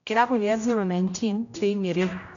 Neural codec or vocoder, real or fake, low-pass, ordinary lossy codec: codec, 16 kHz, 0.5 kbps, X-Codec, HuBERT features, trained on general audio; fake; 7.2 kHz; none